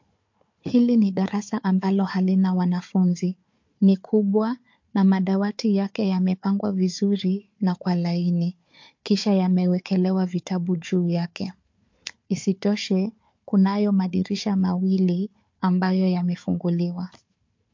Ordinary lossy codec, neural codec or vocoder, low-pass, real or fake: MP3, 48 kbps; codec, 16 kHz, 4 kbps, FunCodec, trained on Chinese and English, 50 frames a second; 7.2 kHz; fake